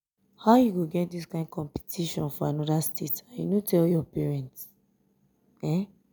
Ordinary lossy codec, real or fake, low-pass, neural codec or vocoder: none; real; none; none